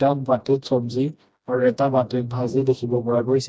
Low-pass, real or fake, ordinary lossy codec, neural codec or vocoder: none; fake; none; codec, 16 kHz, 1 kbps, FreqCodec, smaller model